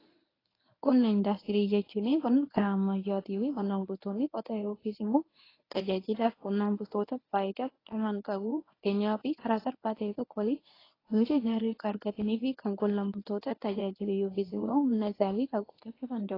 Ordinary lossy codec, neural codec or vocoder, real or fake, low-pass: AAC, 24 kbps; codec, 24 kHz, 0.9 kbps, WavTokenizer, medium speech release version 1; fake; 5.4 kHz